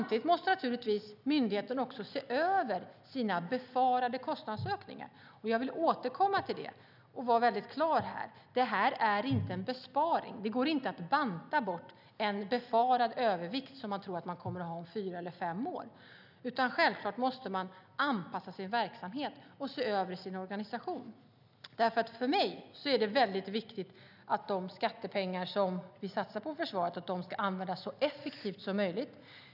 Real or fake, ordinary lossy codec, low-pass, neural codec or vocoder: real; none; 5.4 kHz; none